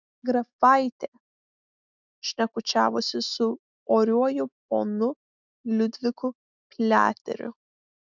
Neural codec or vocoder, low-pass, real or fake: none; 7.2 kHz; real